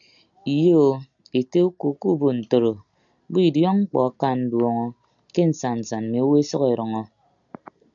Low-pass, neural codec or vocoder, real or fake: 7.2 kHz; none; real